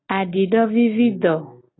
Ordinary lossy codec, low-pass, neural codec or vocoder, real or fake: AAC, 16 kbps; 7.2 kHz; none; real